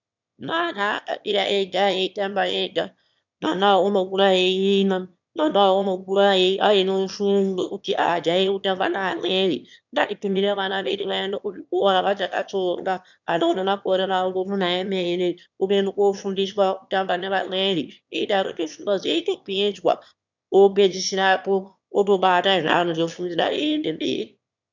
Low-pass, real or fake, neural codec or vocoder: 7.2 kHz; fake; autoencoder, 22.05 kHz, a latent of 192 numbers a frame, VITS, trained on one speaker